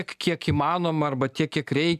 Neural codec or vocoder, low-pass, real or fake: none; 14.4 kHz; real